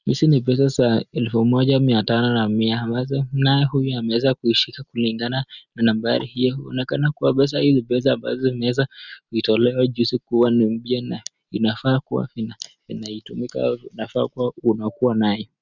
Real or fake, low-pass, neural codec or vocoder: real; 7.2 kHz; none